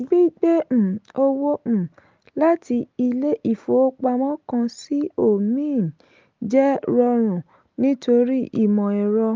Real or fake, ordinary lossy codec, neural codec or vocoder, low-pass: real; Opus, 24 kbps; none; 7.2 kHz